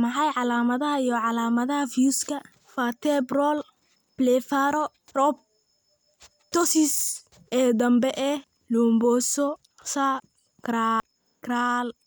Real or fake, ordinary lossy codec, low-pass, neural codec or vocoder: real; none; none; none